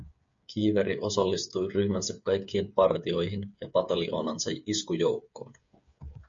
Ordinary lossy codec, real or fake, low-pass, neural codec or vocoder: MP3, 48 kbps; fake; 7.2 kHz; codec, 16 kHz, 8 kbps, FreqCodec, smaller model